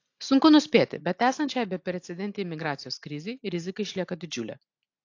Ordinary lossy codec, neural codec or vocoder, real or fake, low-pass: AAC, 48 kbps; none; real; 7.2 kHz